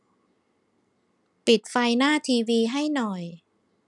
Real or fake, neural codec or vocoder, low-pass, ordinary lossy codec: real; none; 10.8 kHz; none